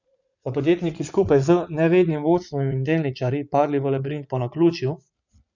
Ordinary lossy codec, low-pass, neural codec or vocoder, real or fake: none; 7.2 kHz; vocoder, 44.1 kHz, 80 mel bands, Vocos; fake